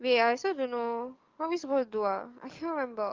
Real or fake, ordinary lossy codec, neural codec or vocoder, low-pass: fake; Opus, 32 kbps; codec, 44.1 kHz, 7.8 kbps, DAC; 7.2 kHz